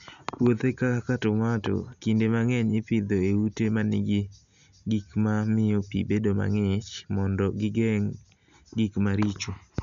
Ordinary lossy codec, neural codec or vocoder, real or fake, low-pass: none; none; real; 7.2 kHz